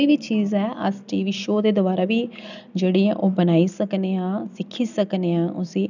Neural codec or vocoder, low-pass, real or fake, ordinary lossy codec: none; 7.2 kHz; real; none